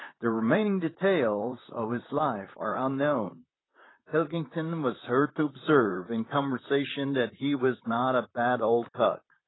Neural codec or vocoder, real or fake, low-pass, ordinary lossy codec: vocoder, 44.1 kHz, 128 mel bands, Pupu-Vocoder; fake; 7.2 kHz; AAC, 16 kbps